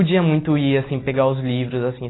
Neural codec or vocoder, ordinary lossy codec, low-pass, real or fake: none; AAC, 16 kbps; 7.2 kHz; real